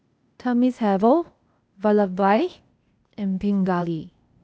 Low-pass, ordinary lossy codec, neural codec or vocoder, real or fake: none; none; codec, 16 kHz, 0.8 kbps, ZipCodec; fake